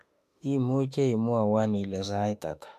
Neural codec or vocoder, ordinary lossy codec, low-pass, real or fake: autoencoder, 48 kHz, 32 numbers a frame, DAC-VAE, trained on Japanese speech; MP3, 96 kbps; 14.4 kHz; fake